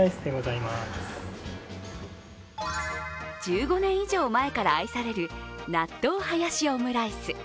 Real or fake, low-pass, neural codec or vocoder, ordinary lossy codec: real; none; none; none